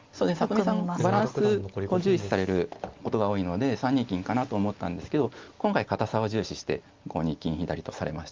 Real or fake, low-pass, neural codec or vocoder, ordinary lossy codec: real; 7.2 kHz; none; Opus, 32 kbps